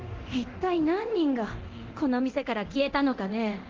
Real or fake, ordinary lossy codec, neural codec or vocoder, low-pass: fake; Opus, 16 kbps; codec, 24 kHz, 0.9 kbps, DualCodec; 7.2 kHz